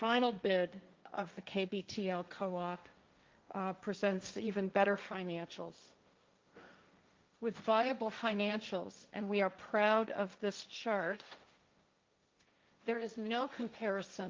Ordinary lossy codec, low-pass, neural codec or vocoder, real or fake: Opus, 24 kbps; 7.2 kHz; codec, 16 kHz, 1.1 kbps, Voila-Tokenizer; fake